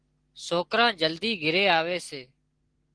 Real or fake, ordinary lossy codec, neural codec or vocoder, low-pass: real; Opus, 16 kbps; none; 9.9 kHz